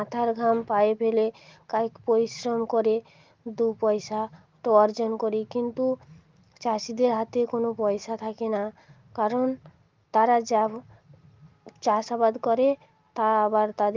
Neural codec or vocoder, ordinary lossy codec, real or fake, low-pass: none; Opus, 32 kbps; real; 7.2 kHz